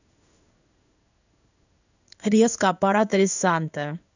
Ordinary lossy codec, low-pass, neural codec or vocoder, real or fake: none; 7.2 kHz; codec, 16 kHz in and 24 kHz out, 1 kbps, XY-Tokenizer; fake